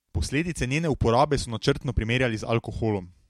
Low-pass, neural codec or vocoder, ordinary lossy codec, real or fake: 19.8 kHz; none; MP3, 64 kbps; real